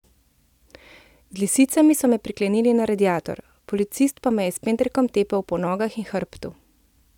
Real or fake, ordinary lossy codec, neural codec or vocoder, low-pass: real; none; none; 19.8 kHz